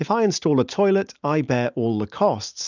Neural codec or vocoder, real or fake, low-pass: none; real; 7.2 kHz